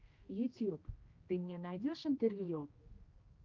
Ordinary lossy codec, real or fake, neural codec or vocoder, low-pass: Opus, 32 kbps; fake; codec, 16 kHz, 1 kbps, X-Codec, HuBERT features, trained on general audio; 7.2 kHz